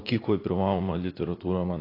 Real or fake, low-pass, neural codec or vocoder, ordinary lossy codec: fake; 5.4 kHz; vocoder, 44.1 kHz, 80 mel bands, Vocos; AAC, 24 kbps